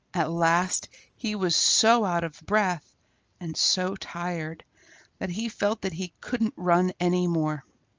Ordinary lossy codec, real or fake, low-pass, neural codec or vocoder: Opus, 24 kbps; real; 7.2 kHz; none